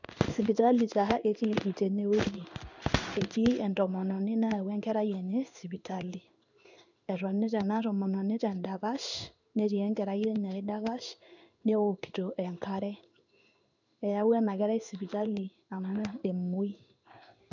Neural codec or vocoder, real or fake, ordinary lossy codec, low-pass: codec, 16 kHz in and 24 kHz out, 1 kbps, XY-Tokenizer; fake; none; 7.2 kHz